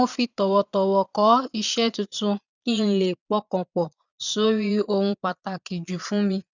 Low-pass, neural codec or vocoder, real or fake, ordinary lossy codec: 7.2 kHz; vocoder, 22.05 kHz, 80 mel bands, Vocos; fake; none